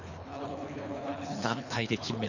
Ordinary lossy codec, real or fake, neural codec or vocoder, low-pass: none; fake; codec, 24 kHz, 3 kbps, HILCodec; 7.2 kHz